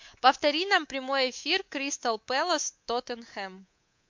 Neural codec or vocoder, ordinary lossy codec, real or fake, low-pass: codec, 24 kHz, 3.1 kbps, DualCodec; MP3, 48 kbps; fake; 7.2 kHz